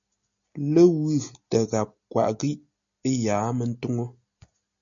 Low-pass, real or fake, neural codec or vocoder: 7.2 kHz; real; none